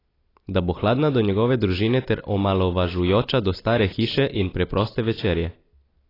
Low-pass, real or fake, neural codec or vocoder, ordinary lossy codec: 5.4 kHz; real; none; AAC, 24 kbps